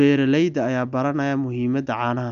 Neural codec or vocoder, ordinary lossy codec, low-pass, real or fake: none; none; 7.2 kHz; real